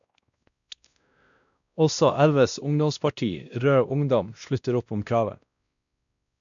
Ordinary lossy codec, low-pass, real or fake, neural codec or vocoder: none; 7.2 kHz; fake; codec, 16 kHz, 1 kbps, X-Codec, WavLM features, trained on Multilingual LibriSpeech